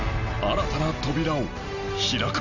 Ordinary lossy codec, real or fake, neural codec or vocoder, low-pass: none; real; none; 7.2 kHz